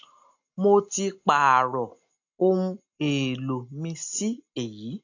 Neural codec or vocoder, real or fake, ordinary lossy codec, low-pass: none; real; AAC, 48 kbps; 7.2 kHz